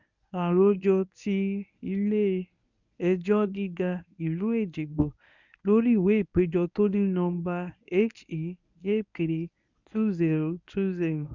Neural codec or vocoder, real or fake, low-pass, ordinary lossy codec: codec, 24 kHz, 0.9 kbps, WavTokenizer, medium speech release version 1; fake; 7.2 kHz; none